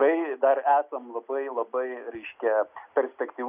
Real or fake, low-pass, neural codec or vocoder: real; 3.6 kHz; none